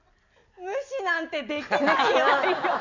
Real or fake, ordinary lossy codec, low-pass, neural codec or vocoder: real; none; 7.2 kHz; none